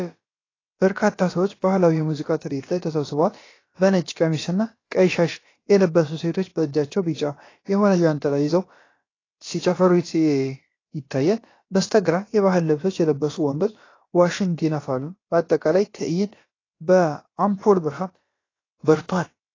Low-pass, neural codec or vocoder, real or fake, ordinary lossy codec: 7.2 kHz; codec, 16 kHz, about 1 kbps, DyCAST, with the encoder's durations; fake; AAC, 32 kbps